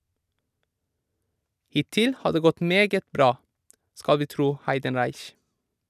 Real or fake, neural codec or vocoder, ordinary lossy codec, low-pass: real; none; none; 14.4 kHz